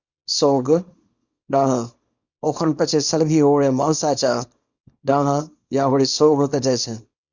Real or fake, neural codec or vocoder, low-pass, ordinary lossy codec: fake; codec, 24 kHz, 0.9 kbps, WavTokenizer, small release; 7.2 kHz; Opus, 64 kbps